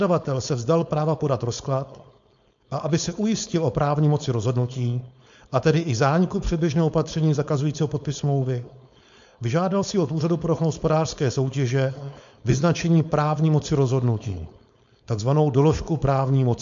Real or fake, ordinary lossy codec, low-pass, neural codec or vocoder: fake; MP3, 64 kbps; 7.2 kHz; codec, 16 kHz, 4.8 kbps, FACodec